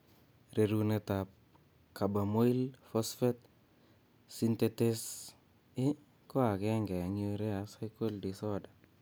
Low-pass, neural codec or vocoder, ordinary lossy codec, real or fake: none; none; none; real